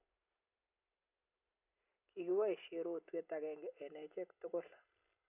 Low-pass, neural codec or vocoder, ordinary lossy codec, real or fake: 3.6 kHz; none; none; real